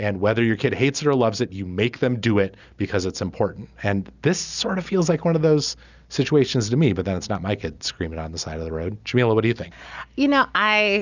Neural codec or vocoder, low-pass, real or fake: none; 7.2 kHz; real